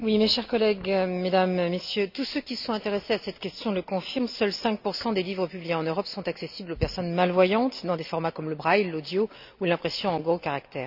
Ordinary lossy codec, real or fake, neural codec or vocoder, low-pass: none; real; none; 5.4 kHz